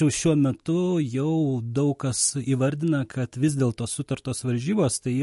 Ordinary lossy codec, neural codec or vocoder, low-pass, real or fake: MP3, 48 kbps; none; 14.4 kHz; real